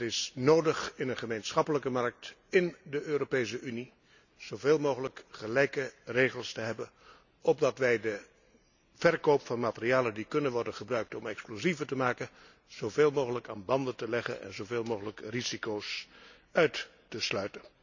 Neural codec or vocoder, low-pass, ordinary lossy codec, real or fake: none; 7.2 kHz; none; real